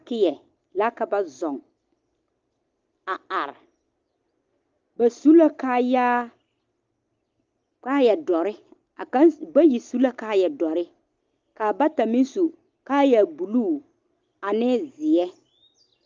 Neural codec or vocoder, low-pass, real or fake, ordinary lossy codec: none; 7.2 kHz; real; Opus, 24 kbps